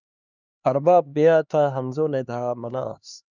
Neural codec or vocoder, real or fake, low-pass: codec, 16 kHz, 2 kbps, X-Codec, HuBERT features, trained on LibriSpeech; fake; 7.2 kHz